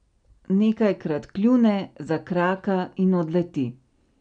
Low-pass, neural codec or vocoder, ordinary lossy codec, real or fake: 9.9 kHz; none; none; real